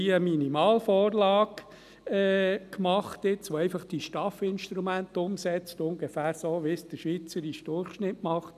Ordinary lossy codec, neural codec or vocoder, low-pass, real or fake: none; none; 14.4 kHz; real